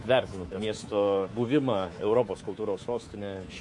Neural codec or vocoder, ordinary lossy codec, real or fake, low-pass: codec, 24 kHz, 3.1 kbps, DualCodec; MP3, 48 kbps; fake; 10.8 kHz